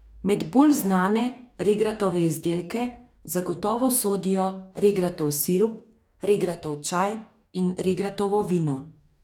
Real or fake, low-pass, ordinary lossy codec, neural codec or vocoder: fake; 19.8 kHz; none; codec, 44.1 kHz, 2.6 kbps, DAC